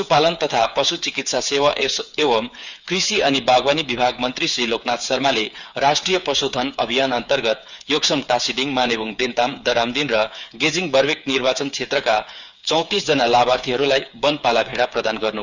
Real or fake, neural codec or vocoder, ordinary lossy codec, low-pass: fake; codec, 16 kHz, 8 kbps, FreqCodec, smaller model; none; 7.2 kHz